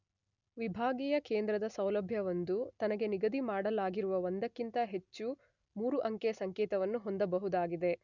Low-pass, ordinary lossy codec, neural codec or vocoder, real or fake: 7.2 kHz; none; none; real